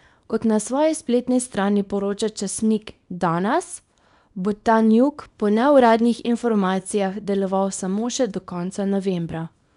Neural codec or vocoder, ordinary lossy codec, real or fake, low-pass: codec, 24 kHz, 0.9 kbps, WavTokenizer, small release; none; fake; 10.8 kHz